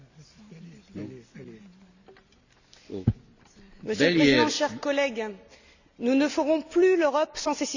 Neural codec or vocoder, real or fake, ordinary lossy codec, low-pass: none; real; none; 7.2 kHz